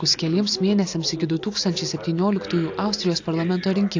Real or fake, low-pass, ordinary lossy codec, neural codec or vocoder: real; 7.2 kHz; AAC, 48 kbps; none